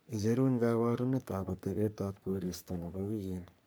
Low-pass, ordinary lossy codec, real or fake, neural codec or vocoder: none; none; fake; codec, 44.1 kHz, 3.4 kbps, Pupu-Codec